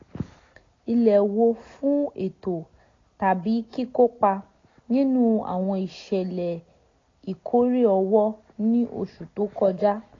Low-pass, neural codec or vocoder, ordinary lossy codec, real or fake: 7.2 kHz; none; AAC, 32 kbps; real